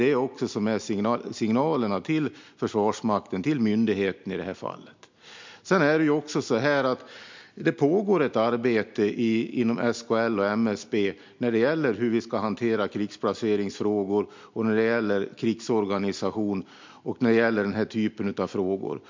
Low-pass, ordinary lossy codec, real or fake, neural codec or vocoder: 7.2 kHz; MP3, 64 kbps; real; none